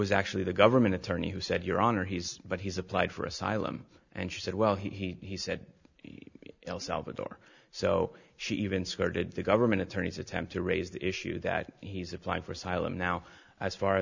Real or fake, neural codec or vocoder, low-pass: real; none; 7.2 kHz